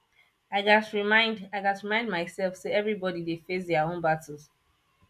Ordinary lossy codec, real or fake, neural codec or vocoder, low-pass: none; real; none; 14.4 kHz